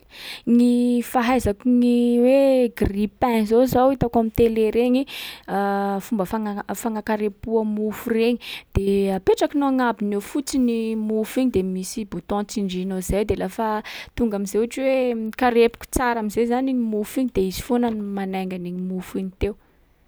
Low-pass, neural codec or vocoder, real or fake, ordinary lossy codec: none; none; real; none